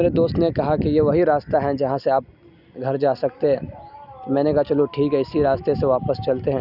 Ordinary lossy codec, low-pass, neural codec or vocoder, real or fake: none; 5.4 kHz; none; real